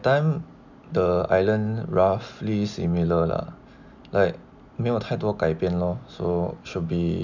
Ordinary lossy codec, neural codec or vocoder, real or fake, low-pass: none; none; real; 7.2 kHz